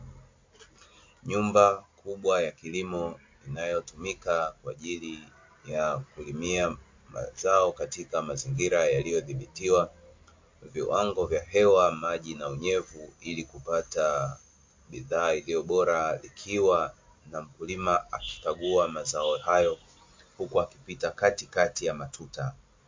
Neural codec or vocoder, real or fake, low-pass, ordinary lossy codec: none; real; 7.2 kHz; MP3, 48 kbps